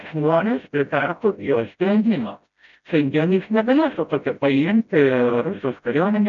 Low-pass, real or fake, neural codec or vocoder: 7.2 kHz; fake; codec, 16 kHz, 0.5 kbps, FreqCodec, smaller model